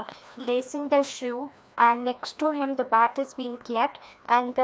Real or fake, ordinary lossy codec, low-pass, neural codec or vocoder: fake; none; none; codec, 16 kHz, 1 kbps, FreqCodec, larger model